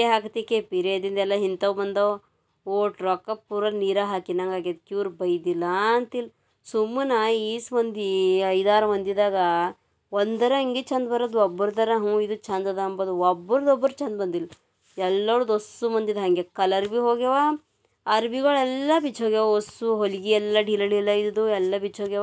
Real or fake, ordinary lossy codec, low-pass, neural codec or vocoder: real; none; none; none